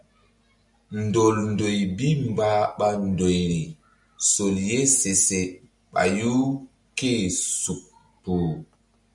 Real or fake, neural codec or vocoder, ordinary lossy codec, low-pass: real; none; MP3, 96 kbps; 10.8 kHz